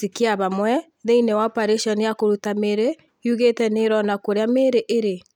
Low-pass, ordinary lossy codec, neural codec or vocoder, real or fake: 19.8 kHz; none; none; real